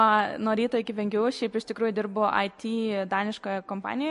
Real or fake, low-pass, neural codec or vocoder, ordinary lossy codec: real; 14.4 kHz; none; MP3, 48 kbps